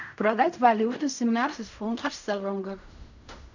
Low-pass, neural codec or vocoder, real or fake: 7.2 kHz; codec, 16 kHz in and 24 kHz out, 0.4 kbps, LongCat-Audio-Codec, fine tuned four codebook decoder; fake